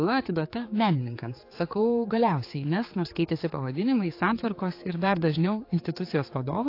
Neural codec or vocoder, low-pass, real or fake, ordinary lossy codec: codec, 16 kHz, 4 kbps, X-Codec, HuBERT features, trained on general audio; 5.4 kHz; fake; AAC, 32 kbps